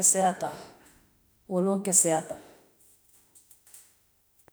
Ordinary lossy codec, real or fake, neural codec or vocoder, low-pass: none; fake; autoencoder, 48 kHz, 32 numbers a frame, DAC-VAE, trained on Japanese speech; none